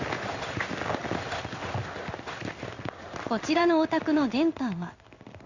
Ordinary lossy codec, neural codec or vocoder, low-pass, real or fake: Opus, 64 kbps; codec, 16 kHz in and 24 kHz out, 1 kbps, XY-Tokenizer; 7.2 kHz; fake